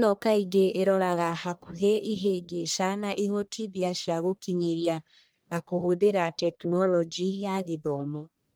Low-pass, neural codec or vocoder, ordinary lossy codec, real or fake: none; codec, 44.1 kHz, 1.7 kbps, Pupu-Codec; none; fake